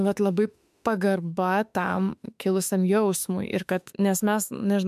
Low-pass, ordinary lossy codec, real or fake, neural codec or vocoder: 14.4 kHz; MP3, 96 kbps; fake; autoencoder, 48 kHz, 32 numbers a frame, DAC-VAE, trained on Japanese speech